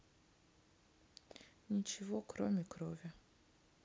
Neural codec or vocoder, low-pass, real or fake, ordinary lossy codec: none; none; real; none